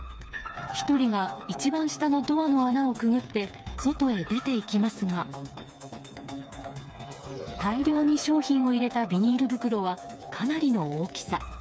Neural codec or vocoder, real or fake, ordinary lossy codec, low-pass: codec, 16 kHz, 4 kbps, FreqCodec, smaller model; fake; none; none